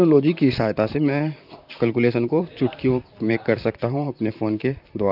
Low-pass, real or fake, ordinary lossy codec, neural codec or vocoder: 5.4 kHz; fake; none; vocoder, 44.1 kHz, 128 mel bands, Pupu-Vocoder